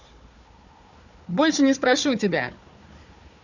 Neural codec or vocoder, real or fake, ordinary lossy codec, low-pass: codec, 16 kHz, 4 kbps, FunCodec, trained on Chinese and English, 50 frames a second; fake; none; 7.2 kHz